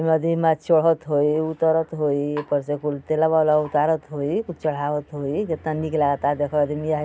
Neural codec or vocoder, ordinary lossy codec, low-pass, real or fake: none; none; none; real